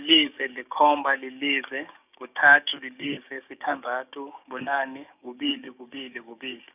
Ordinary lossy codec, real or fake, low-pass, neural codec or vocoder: none; fake; 3.6 kHz; codec, 16 kHz, 6 kbps, DAC